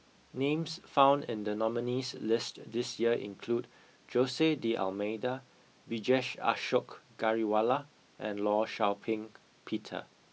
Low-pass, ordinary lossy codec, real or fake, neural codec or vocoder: none; none; real; none